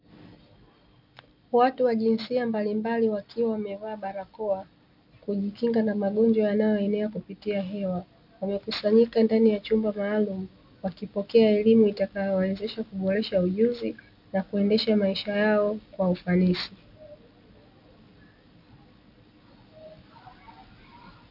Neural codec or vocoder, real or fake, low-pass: none; real; 5.4 kHz